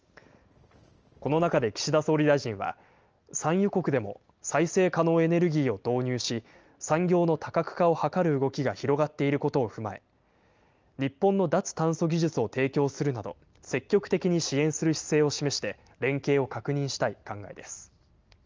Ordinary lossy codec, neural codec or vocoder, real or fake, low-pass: Opus, 24 kbps; none; real; 7.2 kHz